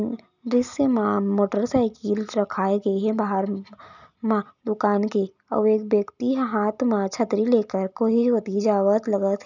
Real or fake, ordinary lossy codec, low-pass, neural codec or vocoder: real; none; 7.2 kHz; none